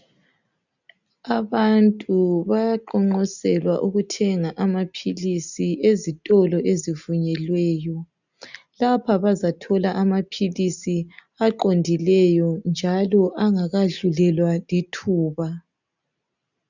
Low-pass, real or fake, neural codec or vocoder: 7.2 kHz; real; none